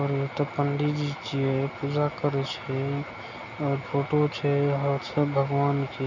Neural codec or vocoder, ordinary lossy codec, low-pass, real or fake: none; none; 7.2 kHz; real